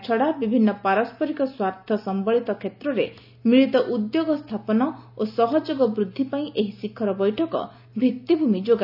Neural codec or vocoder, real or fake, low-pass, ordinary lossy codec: none; real; 5.4 kHz; none